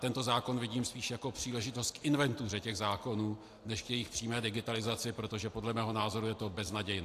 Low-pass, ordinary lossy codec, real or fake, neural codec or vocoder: 14.4 kHz; AAC, 64 kbps; real; none